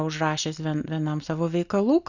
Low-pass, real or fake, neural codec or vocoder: 7.2 kHz; real; none